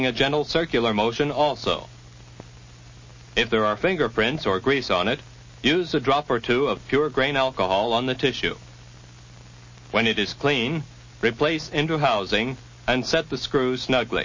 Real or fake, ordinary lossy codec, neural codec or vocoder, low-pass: real; MP3, 32 kbps; none; 7.2 kHz